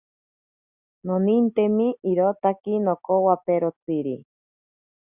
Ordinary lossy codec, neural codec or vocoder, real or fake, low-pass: Opus, 64 kbps; none; real; 3.6 kHz